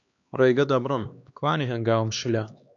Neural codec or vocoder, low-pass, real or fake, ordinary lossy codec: codec, 16 kHz, 2 kbps, X-Codec, HuBERT features, trained on LibriSpeech; 7.2 kHz; fake; MP3, 64 kbps